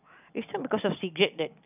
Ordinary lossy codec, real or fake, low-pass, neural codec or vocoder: none; fake; 3.6 kHz; vocoder, 44.1 kHz, 128 mel bands every 256 samples, BigVGAN v2